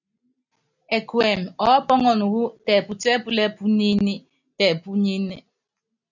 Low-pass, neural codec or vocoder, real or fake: 7.2 kHz; none; real